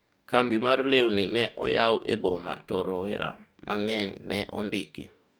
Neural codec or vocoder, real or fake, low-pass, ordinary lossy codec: codec, 44.1 kHz, 2.6 kbps, DAC; fake; none; none